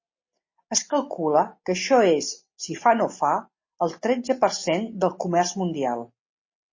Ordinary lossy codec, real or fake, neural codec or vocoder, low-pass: MP3, 32 kbps; real; none; 7.2 kHz